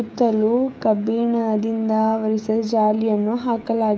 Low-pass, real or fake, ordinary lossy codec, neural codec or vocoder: none; fake; none; codec, 16 kHz, 16 kbps, FreqCodec, smaller model